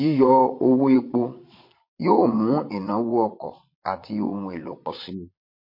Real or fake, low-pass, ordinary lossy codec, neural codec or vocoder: fake; 5.4 kHz; MP3, 32 kbps; vocoder, 22.05 kHz, 80 mel bands, Vocos